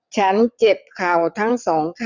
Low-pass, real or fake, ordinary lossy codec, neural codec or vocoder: 7.2 kHz; fake; none; vocoder, 22.05 kHz, 80 mel bands, WaveNeXt